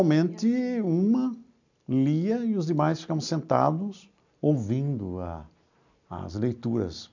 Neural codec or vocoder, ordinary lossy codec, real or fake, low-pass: none; none; real; 7.2 kHz